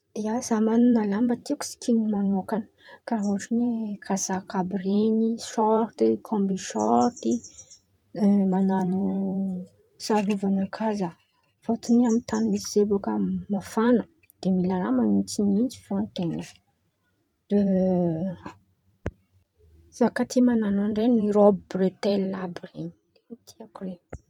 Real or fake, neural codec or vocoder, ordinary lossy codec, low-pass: fake; vocoder, 44.1 kHz, 128 mel bands every 512 samples, BigVGAN v2; none; 19.8 kHz